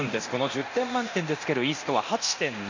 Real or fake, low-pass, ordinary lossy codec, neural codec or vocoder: fake; 7.2 kHz; none; codec, 24 kHz, 0.9 kbps, DualCodec